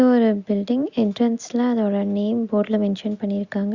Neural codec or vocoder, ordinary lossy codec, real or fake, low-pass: none; Opus, 64 kbps; real; 7.2 kHz